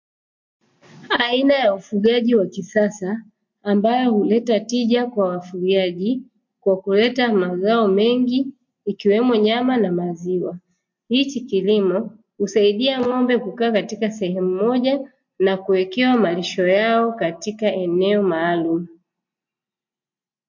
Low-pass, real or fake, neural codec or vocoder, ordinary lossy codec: 7.2 kHz; real; none; MP3, 48 kbps